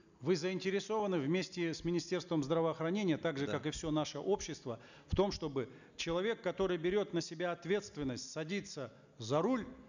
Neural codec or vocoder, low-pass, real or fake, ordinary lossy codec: none; 7.2 kHz; real; none